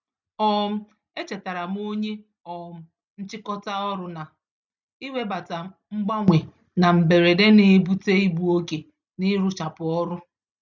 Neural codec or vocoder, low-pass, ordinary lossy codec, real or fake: none; 7.2 kHz; none; real